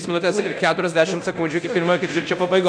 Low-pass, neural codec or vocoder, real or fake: 9.9 kHz; codec, 24 kHz, 0.9 kbps, DualCodec; fake